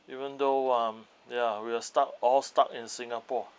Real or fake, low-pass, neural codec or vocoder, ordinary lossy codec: real; none; none; none